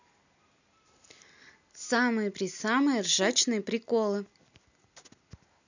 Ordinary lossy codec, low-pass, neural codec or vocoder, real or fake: none; 7.2 kHz; none; real